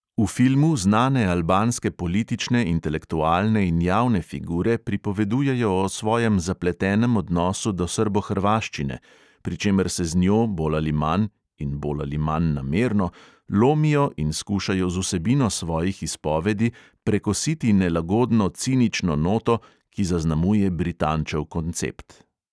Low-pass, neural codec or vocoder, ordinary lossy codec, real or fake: none; none; none; real